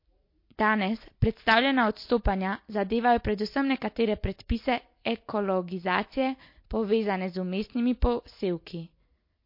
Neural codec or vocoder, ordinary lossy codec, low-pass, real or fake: none; MP3, 32 kbps; 5.4 kHz; real